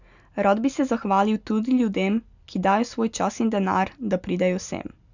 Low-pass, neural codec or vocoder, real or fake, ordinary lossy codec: 7.2 kHz; none; real; none